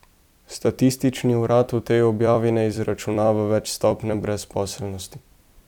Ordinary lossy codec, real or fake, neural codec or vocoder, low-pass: none; fake; vocoder, 44.1 kHz, 128 mel bands every 256 samples, BigVGAN v2; 19.8 kHz